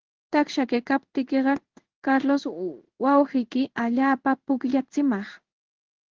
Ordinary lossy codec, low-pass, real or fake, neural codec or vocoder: Opus, 16 kbps; 7.2 kHz; fake; codec, 16 kHz in and 24 kHz out, 1 kbps, XY-Tokenizer